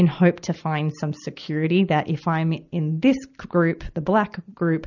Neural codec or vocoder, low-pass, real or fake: none; 7.2 kHz; real